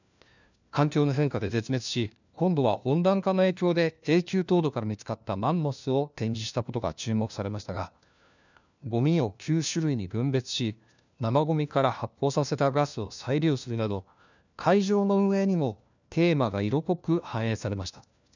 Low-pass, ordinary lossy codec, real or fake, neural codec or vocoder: 7.2 kHz; none; fake; codec, 16 kHz, 1 kbps, FunCodec, trained on LibriTTS, 50 frames a second